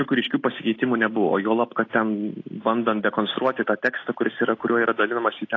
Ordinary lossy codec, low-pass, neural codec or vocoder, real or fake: AAC, 32 kbps; 7.2 kHz; none; real